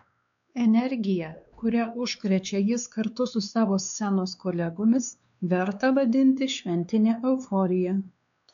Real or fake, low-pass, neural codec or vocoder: fake; 7.2 kHz; codec, 16 kHz, 2 kbps, X-Codec, WavLM features, trained on Multilingual LibriSpeech